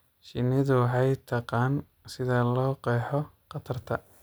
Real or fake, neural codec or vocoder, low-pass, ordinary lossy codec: real; none; none; none